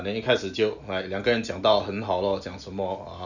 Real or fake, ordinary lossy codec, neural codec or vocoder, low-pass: real; none; none; 7.2 kHz